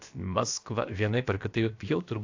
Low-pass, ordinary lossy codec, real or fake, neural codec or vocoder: 7.2 kHz; AAC, 48 kbps; fake; codec, 16 kHz, about 1 kbps, DyCAST, with the encoder's durations